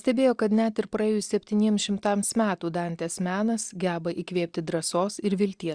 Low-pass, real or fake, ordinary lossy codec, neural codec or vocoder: 9.9 kHz; real; Opus, 64 kbps; none